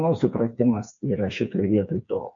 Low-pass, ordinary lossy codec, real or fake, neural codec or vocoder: 7.2 kHz; MP3, 48 kbps; fake; codec, 16 kHz, 4 kbps, FreqCodec, smaller model